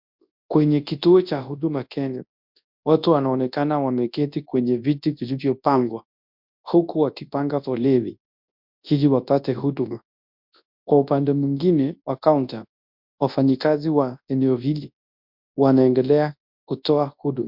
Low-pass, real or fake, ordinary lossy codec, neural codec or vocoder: 5.4 kHz; fake; MP3, 48 kbps; codec, 24 kHz, 0.9 kbps, WavTokenizer, large speech release